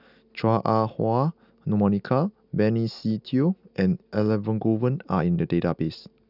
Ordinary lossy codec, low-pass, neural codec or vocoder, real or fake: none; 5.4 kHz; none; real